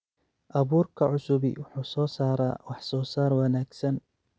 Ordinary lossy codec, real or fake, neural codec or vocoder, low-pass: none; real; none; none